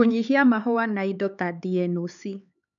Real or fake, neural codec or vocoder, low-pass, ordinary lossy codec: fake; codec, 16 kHz, 4 kbps, X-Codec, HuBERT features, trained on LibriSpeech; 7.2 kHz; none